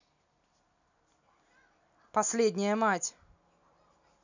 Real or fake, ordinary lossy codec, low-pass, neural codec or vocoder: real; none; 7.2 kHz; none